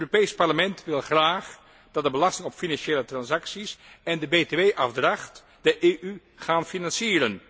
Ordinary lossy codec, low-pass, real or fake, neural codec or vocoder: none; none; real; none